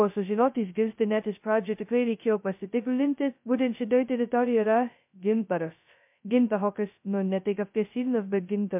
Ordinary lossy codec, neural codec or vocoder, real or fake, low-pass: MP3, 32 kbps; codec, 16 kHz, 0.2 kbps, FocalCodec; fake; 3.6 kHz